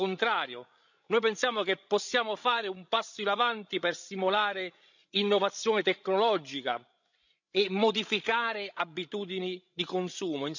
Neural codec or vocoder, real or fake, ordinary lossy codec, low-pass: codec, 16 kHz, 16 kbps, FreqCodec, larger model; fake; none; 7.2 kHz